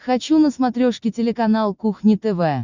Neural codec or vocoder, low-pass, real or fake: none; 7.2 kHz; real